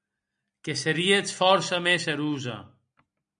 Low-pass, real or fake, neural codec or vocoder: 9.9 kHz; real; none